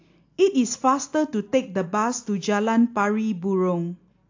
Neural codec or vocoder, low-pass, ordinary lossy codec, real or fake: none; 7.2 kHz; AAC, 48 kbps; real